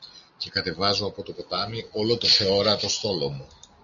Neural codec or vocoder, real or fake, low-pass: none; real; 7.2 kHz